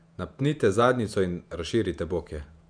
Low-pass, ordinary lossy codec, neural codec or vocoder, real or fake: 9.9 kHz; none; vocoder, 48 kHz, 128 mel bands, Vocos; fake